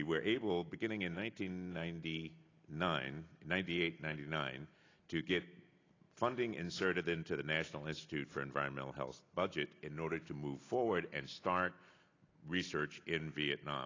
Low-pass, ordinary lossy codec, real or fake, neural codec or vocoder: 7.2 kHz; AAC, 32 kbps; real; none